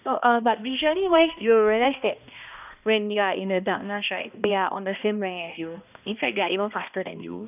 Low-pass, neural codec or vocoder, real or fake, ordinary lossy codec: 3.6 kHz; codec, 16 kHz, 1 kbps, X-Codec, HuBERT features, trained on balanced general audio; fake; none